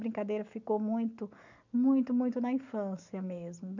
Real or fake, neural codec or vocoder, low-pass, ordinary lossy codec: real; none; 7.2 kHz; none